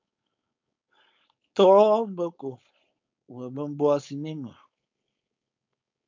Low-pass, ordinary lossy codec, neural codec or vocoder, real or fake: 7.2 kHz; MP3, 64 kbps; codec, 16 kHz, 4.8 kbps, FACodec; fake